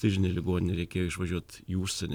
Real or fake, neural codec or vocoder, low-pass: real; none; 19.8 kHz